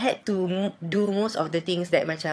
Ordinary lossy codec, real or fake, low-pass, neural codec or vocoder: none; fake; none; vocoder, 22.05 kHz, 80 mel bands, HiFi-GAN